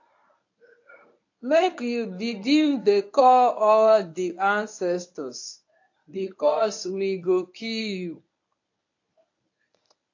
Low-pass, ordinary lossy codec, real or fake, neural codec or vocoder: 7.2 kHz; AAC, 48 kbps; fake; codec, 24 kHz, 0.9 kbps, WavTokenizer, medium speech release version 1